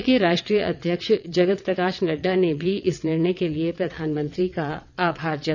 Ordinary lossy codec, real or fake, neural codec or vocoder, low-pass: none; fake; vocoder, 22.05 kHz, 80 mel bands, WaveNeXt; 7.2 kHz